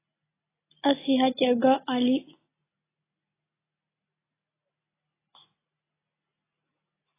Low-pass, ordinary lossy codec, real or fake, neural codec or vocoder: 3.6 kHz; AAC, 16 kbps; real; none